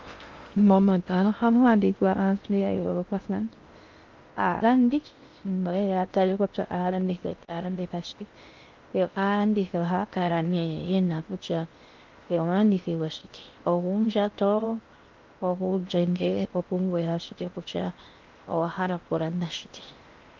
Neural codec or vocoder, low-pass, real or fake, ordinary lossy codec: codec, 16 kHz in and 24 kHz out, 0.6 kbps, FocalCodec, streaming, 2048 codes; 7.2 kHz; fake; Opus, 32 kbps